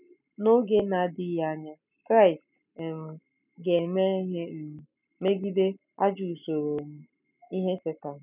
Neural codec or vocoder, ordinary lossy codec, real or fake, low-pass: none; none; real; 3.6 kHz